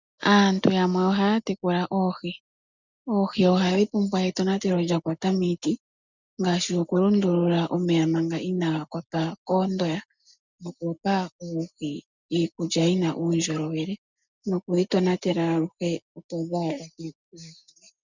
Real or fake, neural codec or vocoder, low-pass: real; none; 7.2 kHz